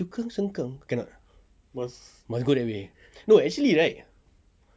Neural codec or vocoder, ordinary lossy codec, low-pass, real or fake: none; none; none; real